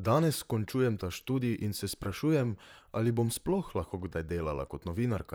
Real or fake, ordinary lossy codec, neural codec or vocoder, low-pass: fake; none; vocoder, 44.1 kHz, 128 mel bands, Pupu-Vocoder; none